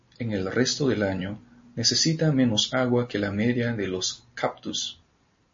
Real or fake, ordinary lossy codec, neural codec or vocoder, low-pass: real; MP3, 32 kbps; none; 7.2 kHz